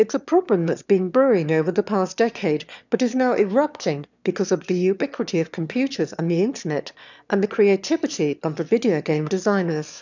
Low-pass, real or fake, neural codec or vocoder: 7.2 kHz; fake; autoencoder, 22.05 kHz, a latent of 192 numbers a frame, VITS, trained on one speaker